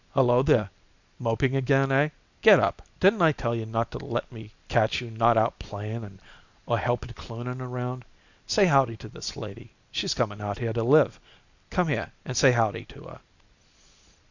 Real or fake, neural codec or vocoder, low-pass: real; none; 7.2 kHz